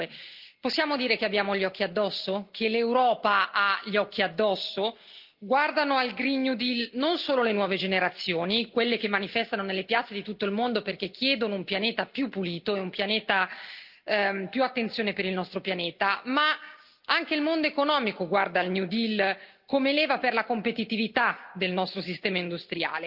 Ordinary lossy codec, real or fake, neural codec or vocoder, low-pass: Opus, 32 kbps; real; none; 5.4 kHz